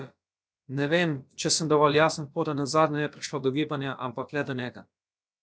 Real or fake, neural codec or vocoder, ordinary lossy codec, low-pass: fake; codec, 16 kHz, about 1 kbps, DyCAST, with the encoder's durations; none; none